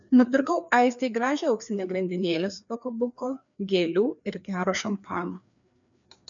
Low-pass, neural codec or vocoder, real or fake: 7.2 kHz; codec, 16 kHz, 2 kbps, FreqCodec, larger model; fake